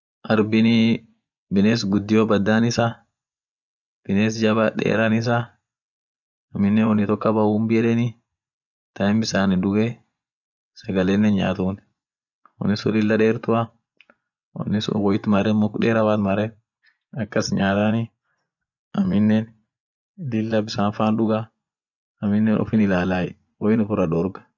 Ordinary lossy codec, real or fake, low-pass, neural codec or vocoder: none; fake; 7.2 kHz; vocoder, 24 kHz, 100 mel bands, Vocos